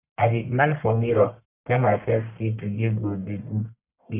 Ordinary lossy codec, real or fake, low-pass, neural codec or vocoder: none; fake; 3.6 kHz; codec, 44.1 kHz, 1.7 kbps, Pupu-Codec